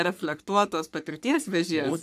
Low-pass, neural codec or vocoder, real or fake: 14.4 kHz; codec, 44.1 kHz, 3.4 kbps, Pupu-Codec; fake